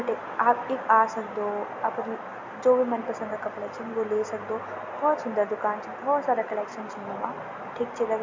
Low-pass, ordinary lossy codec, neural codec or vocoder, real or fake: 7.2 kHz; MP3, 48 kbps; none; real